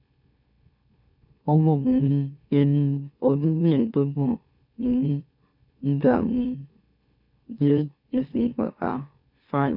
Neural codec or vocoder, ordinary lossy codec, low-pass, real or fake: autoencoder, 44.1 kHz, a latent of 192 numbers a frame, MeloTTS; none; 5.4 kHz; fake